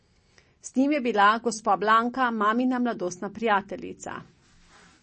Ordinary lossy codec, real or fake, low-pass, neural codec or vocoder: MP3, 32 kbps; real; 10.8 kHz; none